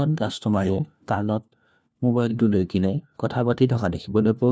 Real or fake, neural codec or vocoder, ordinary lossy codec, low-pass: fake; codec, 16 kHz, 1 kbps, FunCodec, trained on LibriTTS, 50 frames a second; none; none